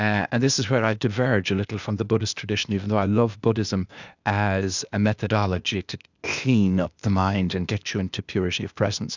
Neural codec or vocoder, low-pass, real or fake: codec, 16 kHz, 0.8 kbps, ZipCodec; 7.2 kHz; fake